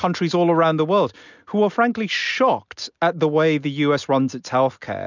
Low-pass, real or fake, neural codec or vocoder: 7.2 kHz; real; none